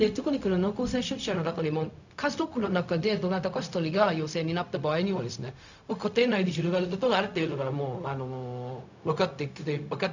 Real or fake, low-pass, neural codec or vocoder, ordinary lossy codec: fake; 7.2 kHz; codec, 16 kHz, 0.4 kbps, LongCat-Audio-Codec; none